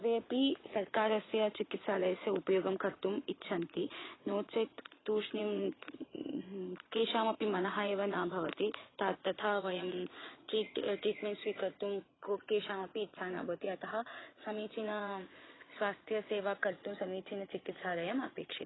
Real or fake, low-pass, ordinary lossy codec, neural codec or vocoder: fake; 7.2 kHz; AAC, 16 kbps; vocoder, 22.05 kHz, 80 mel bands, Vocos